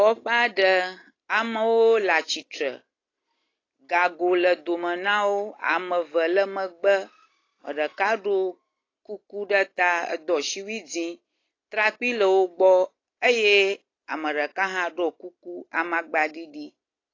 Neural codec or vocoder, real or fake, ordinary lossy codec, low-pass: none; real; AAC, 32 kbps; 7.2 kHz